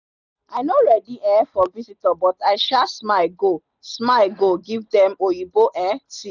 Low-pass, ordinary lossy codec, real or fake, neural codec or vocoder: 7.2 kHz; none; real; none